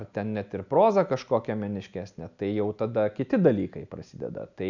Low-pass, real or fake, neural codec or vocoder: 7.2 kHz; real; none